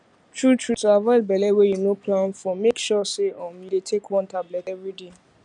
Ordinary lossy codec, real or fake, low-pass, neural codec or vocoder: none; real; 9.9 kHz; none